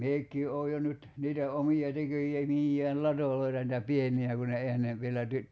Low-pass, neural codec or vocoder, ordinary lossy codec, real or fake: none; none; none; real